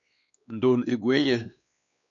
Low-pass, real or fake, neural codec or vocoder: 7.2 kHz; fake; codec, 16 kHz, 2 kbps, X-Codec, WavLM features, trained on Multilingual LibriSpeech